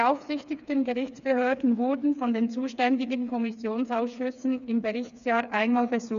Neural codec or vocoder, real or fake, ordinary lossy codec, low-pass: codec, 16 kHz, 4 kbps, FreqCodec, smaller model; fake; none; 7.2 kHz